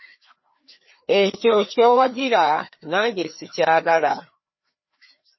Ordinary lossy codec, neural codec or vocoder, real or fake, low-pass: MP3, 24 kbps; codec, 16 kHz, 2 kbps, FreqCodec, larger model; fake; 7.2 kHz